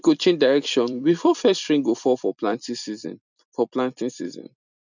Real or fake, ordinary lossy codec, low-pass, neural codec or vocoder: fake; none; 7.2 kHz; vocoder, 44.1 kHz, 128 mel bands every 256 samples, BigVGAN v2